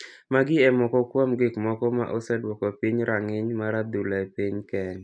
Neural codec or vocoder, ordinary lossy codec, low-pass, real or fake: none; none; 9.9 kHz; real